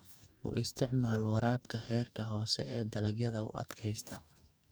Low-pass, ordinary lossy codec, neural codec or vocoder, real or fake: none; none; codec, 44.1 kHz, 2.6 kbps, DAC; fake